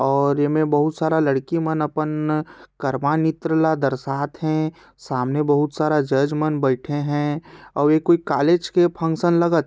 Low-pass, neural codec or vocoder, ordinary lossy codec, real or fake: none; none; none; real